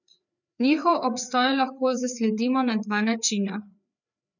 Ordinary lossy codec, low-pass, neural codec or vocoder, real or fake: none; 7.2 kHz; codec, 16 kHz, 4 kbps, FreqCodec, larger model; fake